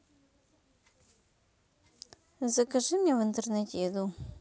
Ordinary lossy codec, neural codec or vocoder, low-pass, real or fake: none; none; none; real